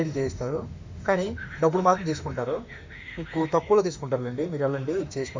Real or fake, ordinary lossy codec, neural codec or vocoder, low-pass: fake; MP3, 64 kbps; autoencoder, 48 kHz, 32 numbers a frame, DAC-VAE, trained on Japanese speech; 7.2 kHz